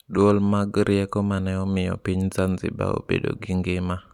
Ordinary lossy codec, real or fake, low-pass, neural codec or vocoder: none; real; 19.8 kHz; none